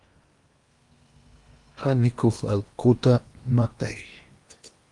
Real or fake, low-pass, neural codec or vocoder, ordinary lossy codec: fake; 10.8 kHz; codec, 16 kHz in and 24 kHz out, 0.8 kbps, FocalCodec, streaming, 65536 codes; Opus, 32 kbps